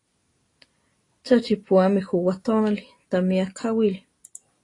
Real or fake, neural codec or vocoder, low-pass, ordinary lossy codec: real; none; 10.8 kHz; AAC, 32 kbps